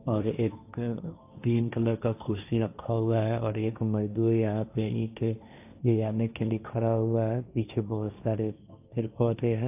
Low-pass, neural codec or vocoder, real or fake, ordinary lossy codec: 3.6 kHz; codec, 16 kHz, 1.1 kbps, Voila-Tokenizer; fake; AAC, 32 kbps